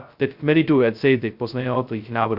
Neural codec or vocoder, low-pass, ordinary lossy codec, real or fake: codec, 16 kHz, 0.2 kbps, FocalCodec; 5.4 kHz; Opus, 64 kbps; fake